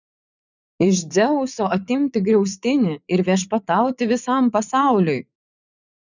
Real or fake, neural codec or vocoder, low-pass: fake; vocoder, 44.1 kHz, 80 mel bands, Vocos; 7.2 kHz